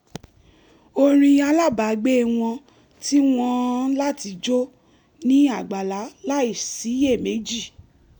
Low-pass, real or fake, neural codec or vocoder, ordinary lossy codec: 19.8 kHz; real; none; none